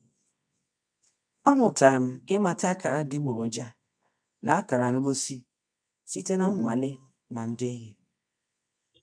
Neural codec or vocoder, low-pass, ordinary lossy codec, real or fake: codec, 24 kHz, 0.9 kbps, WavTokenizer, medium music audio release; 9.9 kHz; none; fake